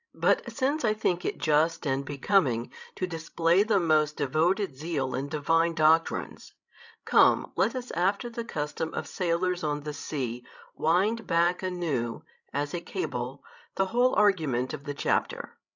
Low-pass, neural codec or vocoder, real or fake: 7.2 kHz; codec, 16 kHz, 16 kbps, FreqCodec, larger model; fake